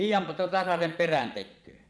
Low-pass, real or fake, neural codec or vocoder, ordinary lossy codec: none; fake; vocoder, 22.05 kHz, 80 mel bands, Vocos; none